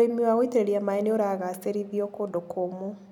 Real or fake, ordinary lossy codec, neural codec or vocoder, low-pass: real; none; none; 19.8 kHz